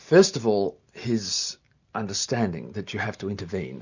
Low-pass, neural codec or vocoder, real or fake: 7.2 kHz; none; real